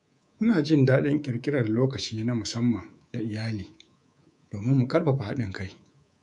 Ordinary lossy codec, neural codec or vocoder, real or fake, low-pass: none; codec, 24 kHz, 3.1 kbps, DualCodec; fake; 10.8 kHz